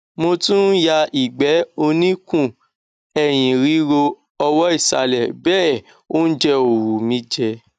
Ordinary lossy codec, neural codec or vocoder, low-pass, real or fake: none; none; 9.9 kHz; real